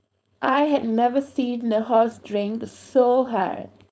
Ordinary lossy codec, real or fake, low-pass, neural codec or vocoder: none; fake; none; codec, 16 kHz, 4.8 kbps, FACodec